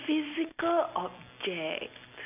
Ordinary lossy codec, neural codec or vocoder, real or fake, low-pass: AAC, 32 kbps; none; real; 3.6 kHz